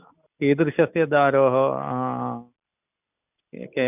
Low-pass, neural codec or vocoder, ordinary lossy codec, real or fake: 3.6 kHz; none; none; real